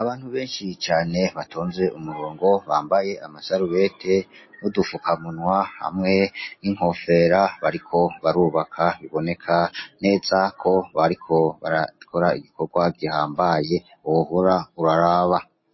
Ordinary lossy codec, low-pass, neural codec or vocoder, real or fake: MP3, 24 kbps; 7.2 kHz; none; real